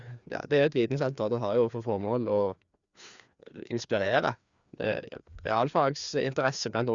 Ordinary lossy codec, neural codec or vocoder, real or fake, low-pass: Opus, 64 kbps; codec, 16 kHz, 2 kbps, FreqCodec, larger model; fake; 7.2 kHz